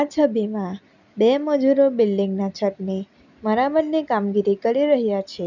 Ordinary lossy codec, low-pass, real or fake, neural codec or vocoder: none; 7.2 kHz; real; none